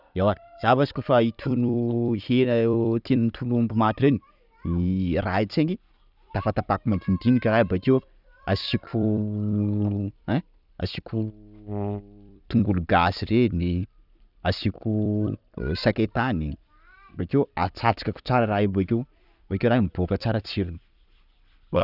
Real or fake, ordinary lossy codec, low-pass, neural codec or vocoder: fake; none; 5.4 kHz; vocoder, 44.1 kHz, 128 mel bands every 256 samples, BigVGAN v2